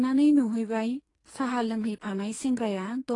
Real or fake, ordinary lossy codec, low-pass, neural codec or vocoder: fake; AAC, 32 kbps; 10.8 kHz; codec, 44.1 kHz, 2.6 kbps, SNAC